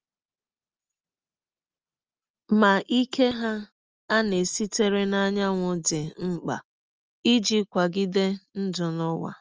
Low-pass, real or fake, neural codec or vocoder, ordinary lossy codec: 7.2 kHz; real; none; Opus, 24 kbps